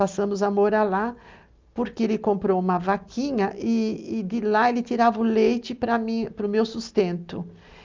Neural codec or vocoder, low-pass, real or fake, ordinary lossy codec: none; 7.2 kHz; real; Opus, 24 kbps